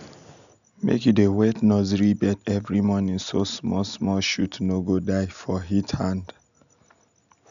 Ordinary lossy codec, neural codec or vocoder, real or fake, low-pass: none; none; real; 7.2 kHz